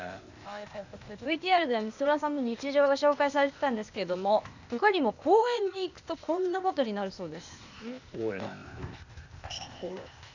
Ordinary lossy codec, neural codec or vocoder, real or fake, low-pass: none; codec, 16 kHz, 0.8 kbps, ZipCodec; fake; 7.2 kHz